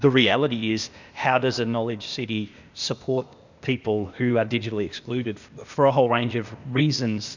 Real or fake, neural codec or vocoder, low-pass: fake; codec, 16 kHz, 0.8 kbps, ZipCodec; 7.2 kHz